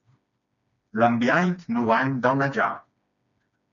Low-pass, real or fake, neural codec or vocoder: 7.2 kHz; fake; codec, 16 kHz, 2 kbps, FreqCodec, smaller model